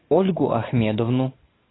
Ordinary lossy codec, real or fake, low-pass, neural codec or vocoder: AAC, 16 kbps; real; 7.2 kHz; none